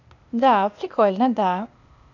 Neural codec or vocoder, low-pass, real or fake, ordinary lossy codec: codec, 16 kHz, 0.8 kbps, ZipCodec; 7.2 kHz; fake; none